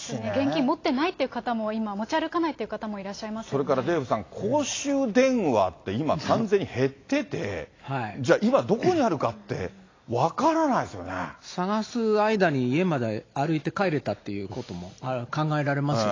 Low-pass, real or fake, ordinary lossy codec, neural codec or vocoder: 7.2 kHz; real; AAC, 32 kbps; none